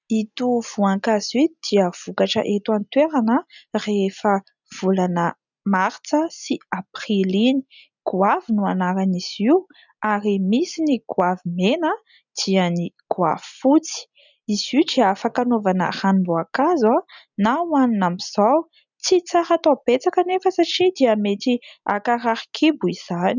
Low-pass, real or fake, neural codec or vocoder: 7.2 kHz; real; none